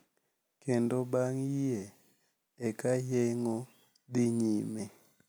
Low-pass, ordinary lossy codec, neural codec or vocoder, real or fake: none; none; none; real